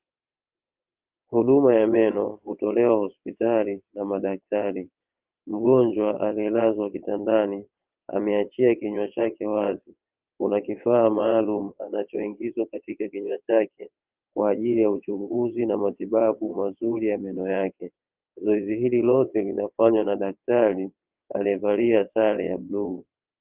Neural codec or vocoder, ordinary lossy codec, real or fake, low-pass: vocoder, 22.05 kHz, 80 mel bands, WaveNeXt; Opus, 32 kbps; fake; 3.6 kHz